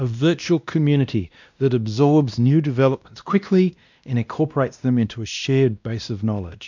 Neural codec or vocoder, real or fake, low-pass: codec, 16 kHz, 1 kbps, X-Codec, WavLM features, trained on Multilingual LibriSpeech; fake; 7.2 kHz